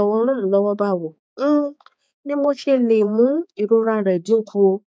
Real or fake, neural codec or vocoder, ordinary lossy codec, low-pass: fake; codec, 16 kHz, 4 kbps, X-Codec, HuBERT features, trained on balanced general audio; none; none